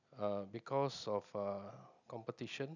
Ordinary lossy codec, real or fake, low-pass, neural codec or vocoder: none; real; 7.2 kHz; none